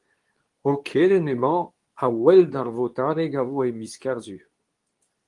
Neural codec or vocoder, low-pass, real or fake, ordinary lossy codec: codec, 24 kHz, 0.9 kbps, WavTokenizer, medium speech release version 2; 10.8 kHz; fake; Opus, 24 kbps